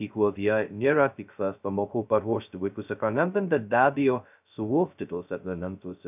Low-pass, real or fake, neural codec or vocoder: 3.6 kHz; fake; codec, 16 kHz, 0.2 kbps, FocalCodec